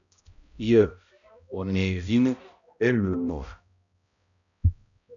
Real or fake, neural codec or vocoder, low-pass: fake; codec, 16 kHz, 0.5 kbps, X-Codec, HuBERT features, trained on balanced general audio; 7.2 kHz